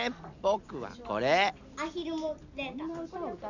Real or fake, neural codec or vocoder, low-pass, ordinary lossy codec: real; none; 7.2 kHz; none